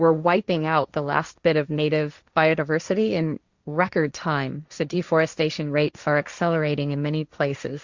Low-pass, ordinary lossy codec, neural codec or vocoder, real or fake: 7.2 kHz; Opus, 64 kbps; codec, 16 kHz, 1.1 kbps, Voila-Tokenizer; fake